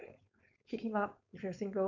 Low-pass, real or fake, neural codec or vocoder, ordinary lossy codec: 7.2 kHz; fake; codec, 16 kHz, 4.8 kbps, FACodec; none